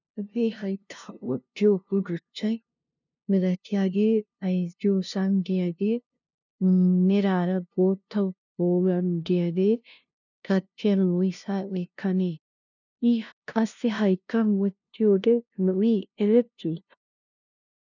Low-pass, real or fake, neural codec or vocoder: 7.2 kHz; fake; codec, 16 kHz, 0.5 kbps, FunCodec, trained on LibriTTS, 25 frames a second